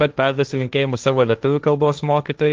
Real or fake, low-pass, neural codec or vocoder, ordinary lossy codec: fake; 7.2 kHz; codec, 16 kHz, 1.1 kbps, Voila-Tokenizer; Opus, 32 kbps